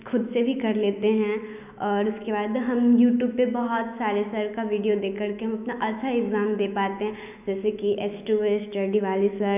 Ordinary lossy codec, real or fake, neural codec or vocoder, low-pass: none; fake; autoencoder, 48 kHz, 128 numbers a frame, DAC-VAE, trained on Japanese speech; 3.6 kHz